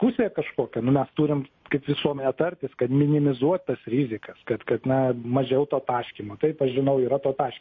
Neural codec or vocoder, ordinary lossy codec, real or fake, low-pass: none; MP3, 32 kbps; real; 7.2 kHz